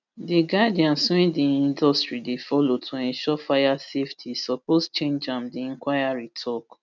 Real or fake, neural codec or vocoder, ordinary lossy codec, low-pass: real; none; none; 7.2 kHz